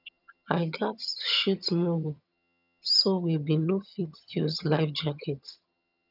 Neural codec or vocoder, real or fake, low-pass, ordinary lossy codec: vocoder, 22.05 kHz, 80 mel bands, HiFi-GAN; fake; 5.4 kHz; none